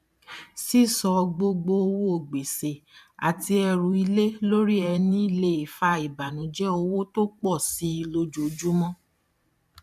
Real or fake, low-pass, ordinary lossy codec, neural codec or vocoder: fake; 14.4 kHz; none; vocoder, 44.1 kHz, 128 mel bands every 512 samples, BigVGAN v2